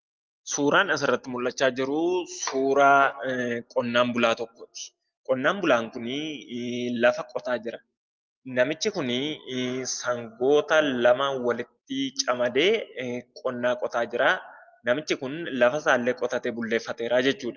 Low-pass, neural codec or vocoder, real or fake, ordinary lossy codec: 7.2 kHz; none; real; Opus, 32 kbps